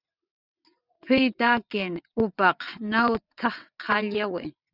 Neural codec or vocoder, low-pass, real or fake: vocoder, 22.05 kHz, 80 mel bands, WaveNeXt; 5.4 kHz; fake